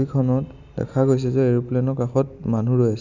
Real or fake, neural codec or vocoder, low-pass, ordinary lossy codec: real; none; 7.2 kHz; none